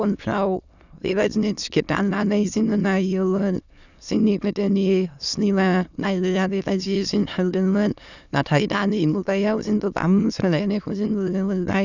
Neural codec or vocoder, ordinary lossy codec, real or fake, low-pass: autoencoder, 22.05 kHz, a latent of 192 numbers a frame, VITS, trained on many speakers; none; fake; 7.2 kHz